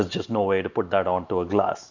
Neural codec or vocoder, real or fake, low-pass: none; real; 7.2 kHz